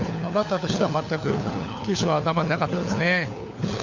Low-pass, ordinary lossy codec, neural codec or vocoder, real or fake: 7.2 kHz; none; codec, 16 kHz, 4 kbps, FunCodec, trained on LibriTTS, 50 frames a second; fake